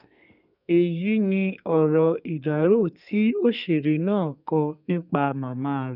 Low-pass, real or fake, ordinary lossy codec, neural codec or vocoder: 5.4 kHz; fake; none; codec, 32 kHz, 1.9 kbps, SNAC